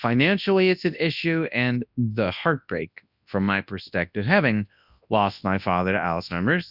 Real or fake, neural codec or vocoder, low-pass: fake; codec, 24 kHz, 0.9 kbps, WavTokenizer, large speech release; 5.4 kHz